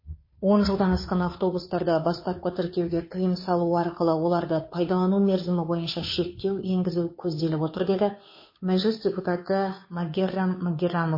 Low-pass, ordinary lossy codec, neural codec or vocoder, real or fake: 5.4 kHz; MP3, 24 kbps; codec, 16 kHz, 2 kbps, FunCodec, trained on Chinese and English, 25 frames a second; fake